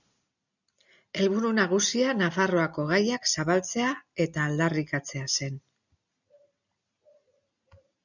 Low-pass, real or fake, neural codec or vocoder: 7.2 kHz; real; none